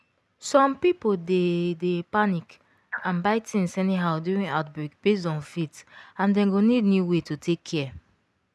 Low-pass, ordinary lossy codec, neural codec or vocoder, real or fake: none; none; none; real